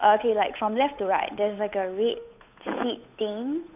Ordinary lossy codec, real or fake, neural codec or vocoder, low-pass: none; fake; codec, 16 kHz, 8 kbps, FunCodec, trained on Chinese and English, 25 frames a second; 3.6 kHz